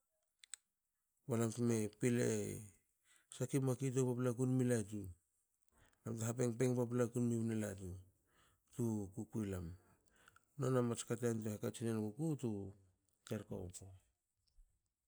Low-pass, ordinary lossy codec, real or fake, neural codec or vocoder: none; none; real; none